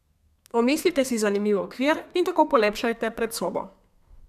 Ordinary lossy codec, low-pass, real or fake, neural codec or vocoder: none; 14.4 kHz; fake; codec, 32 kHz, 1.9 kbps, SNAC